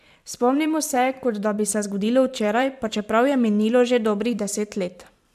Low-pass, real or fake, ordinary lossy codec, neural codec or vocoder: 14.4 kHz; fake; AAC, 96 kbps; vocoder, 44.1 kHz, 128 mel bands every 512 samples, BigVGAN v2